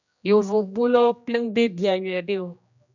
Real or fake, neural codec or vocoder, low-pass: fake; codec, 16 kHz, 1 kbps, X-Codec, HuBERT features, trained on general audio; 7.2 kHz